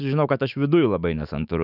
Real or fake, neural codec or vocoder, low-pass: fake; autoencoder, 48 kHz, 128 numbers a frame, DAC-VAE, trained on Japanese speech; 5.4 kHz